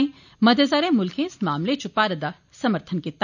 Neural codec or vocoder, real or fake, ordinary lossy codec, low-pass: none; real; none; 7.2 kHz